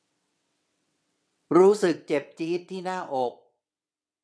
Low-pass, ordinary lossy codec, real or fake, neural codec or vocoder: none; none; fake; vocoder, 22.05 kHz, 80 mel bands, WaveNeXt